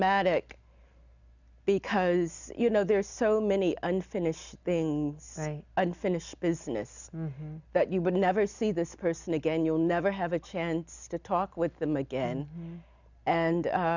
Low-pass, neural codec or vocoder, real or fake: 7.2 kHz; none; real